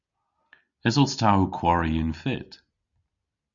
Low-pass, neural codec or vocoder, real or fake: 7.2 kHz; none; real